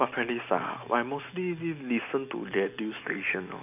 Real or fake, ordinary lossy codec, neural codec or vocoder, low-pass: real; none; none; 3.6 kHz